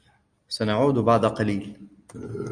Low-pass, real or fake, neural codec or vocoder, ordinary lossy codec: 9.9 kHz; real; none; Opus, 64 kbps